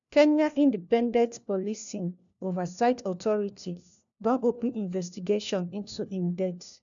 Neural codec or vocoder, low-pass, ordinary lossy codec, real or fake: codec, 16 kHz, 1 kbps, FunCodec, trained on LibriTTS, 50 frames a second; 7.2 kHz; none; fake